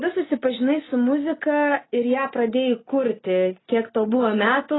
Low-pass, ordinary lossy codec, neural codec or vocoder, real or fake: 7.2 kHz; AAC, 16 kbps; none; real